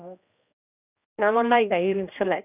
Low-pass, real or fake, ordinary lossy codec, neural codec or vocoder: 3.6 kHz; fake; none; codec, 16 kHz, 1 kbps, X-Codec, HuBERT features, trained on general audio